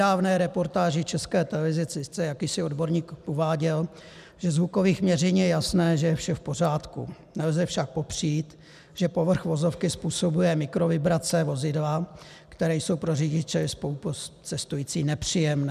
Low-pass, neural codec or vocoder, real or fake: 14.4 kHz; none; real